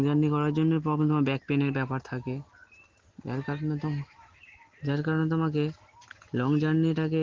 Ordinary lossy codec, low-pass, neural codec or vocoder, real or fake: Opus, 16 kbps; 7.2 kHz; none; real